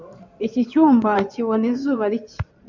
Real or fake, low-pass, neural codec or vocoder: fake; 7.2 kHz; codec, 16 kHz in and 24 kHz out, 2.2 kbps, FireRedTTS-2 codec